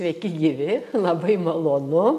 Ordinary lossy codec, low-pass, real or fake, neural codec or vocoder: MP3, 64 kbps; 14.4 kHz; real; none